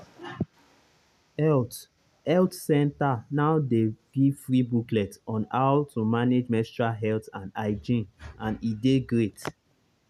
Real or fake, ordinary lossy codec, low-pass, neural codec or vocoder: real; none; 14.4 kHz; none